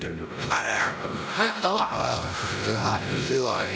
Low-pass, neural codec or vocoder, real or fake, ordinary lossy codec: none; codec, 16 kHz, 0.5 kbps, X-Codec, WavLM features, trained on Multilingual LibriSpeech; fake; none